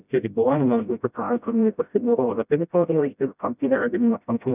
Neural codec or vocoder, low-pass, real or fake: codec, 16 kHz, 0.5 kbps, FreqCodec, smaller model; 3.6 kHz; fake